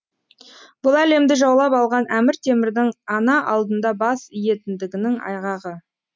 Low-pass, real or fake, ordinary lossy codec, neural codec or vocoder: none; real; none; none